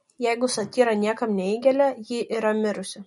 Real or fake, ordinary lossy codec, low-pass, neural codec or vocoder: real; MP3, 48 kbps; 19.8 kHz; none